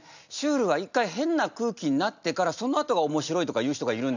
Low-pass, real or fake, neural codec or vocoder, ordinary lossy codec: 7.2 kHz; real; none; none